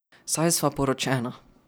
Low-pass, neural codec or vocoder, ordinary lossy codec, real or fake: none; none; none; real